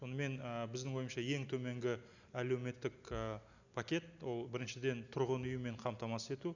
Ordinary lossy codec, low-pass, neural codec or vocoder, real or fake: none; 7.2 kHz; none; real